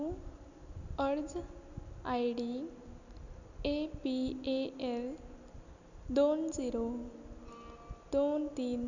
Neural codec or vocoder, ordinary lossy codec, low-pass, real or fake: none; none; 7.2 kHz; real